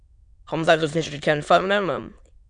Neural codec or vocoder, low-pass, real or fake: autoencoder, 22.05 kHz, a latent of 192 numbers a frame, VITS, trained on many speakers; 9.9 kHz; fake